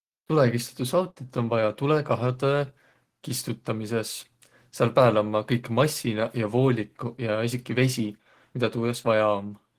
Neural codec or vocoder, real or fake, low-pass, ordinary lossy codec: none; real; 14.4 kHz; Opus, 16 kbps